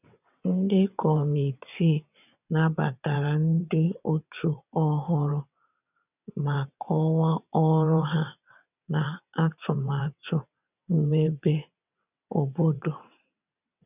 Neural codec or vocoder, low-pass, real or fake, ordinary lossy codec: vocoder, 22.05 kHz, 80 mel bands, WaveNeXt; 3.6 kHz; fake; none